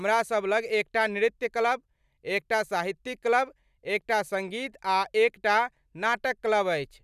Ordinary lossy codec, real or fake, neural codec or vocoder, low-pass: none; real; none; 14.4 kHz